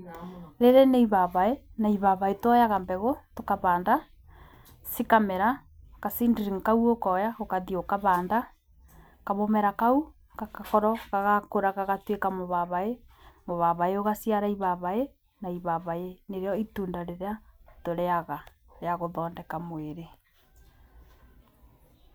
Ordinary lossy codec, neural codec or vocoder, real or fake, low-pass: none; none; real; none